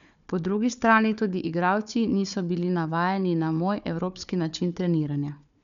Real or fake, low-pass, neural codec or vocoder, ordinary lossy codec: fake; 7.2 kHz; codec, 16 kHz, 4 kbps, FunCodec, trained on Chinese and English, 50 frames a second; none